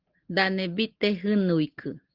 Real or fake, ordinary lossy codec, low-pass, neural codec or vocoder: real; Opus, 16 kbps; 5.4 kHz; none